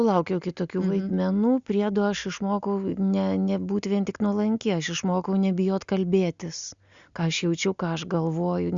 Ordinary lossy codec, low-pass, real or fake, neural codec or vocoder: Opus, 64 kbps; 7.2 kHz; real; none